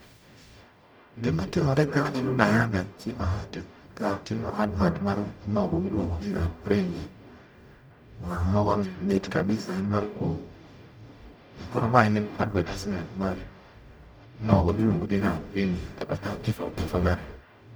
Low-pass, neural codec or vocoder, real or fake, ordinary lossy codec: none; codec, 44.1 kHz, 0.9 kbps, DAC; fake; none